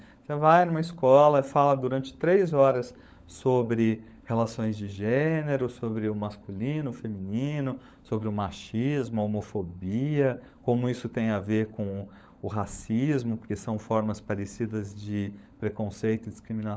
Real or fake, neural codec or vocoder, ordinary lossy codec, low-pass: fake; codec, 16 kHz, 16 kbps, FunCodec, trained on LibriTTS, 50 frames a second; none; none